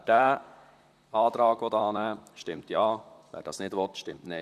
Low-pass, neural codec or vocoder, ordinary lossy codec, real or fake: 14.4 kHz; vocoder, 44.1 kHz, 128 mel bands, Pupu-Vocoder; none; fake